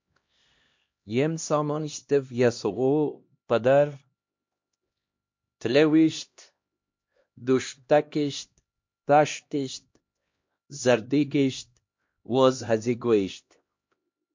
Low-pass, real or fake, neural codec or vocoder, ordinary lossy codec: 7.2 kHz; fake; codec, 16 kHz, 1 kbps, X-Codec, HuBERT features, trained on LibriSpeech; MP3, 48 kbps